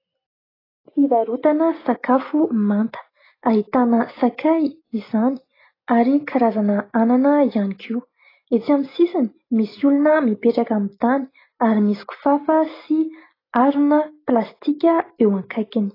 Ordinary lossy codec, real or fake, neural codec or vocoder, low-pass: AAC, 24 kbps; real; none; 5.4 kHz